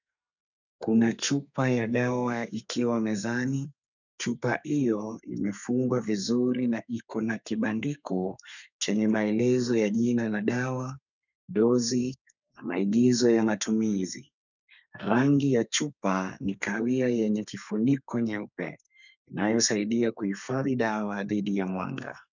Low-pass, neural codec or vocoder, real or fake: 7.2 kHz; codec, 32 kHz, 1.9 kbps, SNAC; fake